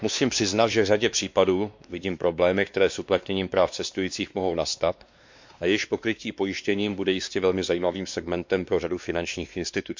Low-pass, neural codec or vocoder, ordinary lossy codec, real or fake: 7.2 kHz; codec, 16 kHz, 2 kbps, X-Codec, WavLM features, trained on Multilingual LibriSpeech; MP3, 64 kbps; fake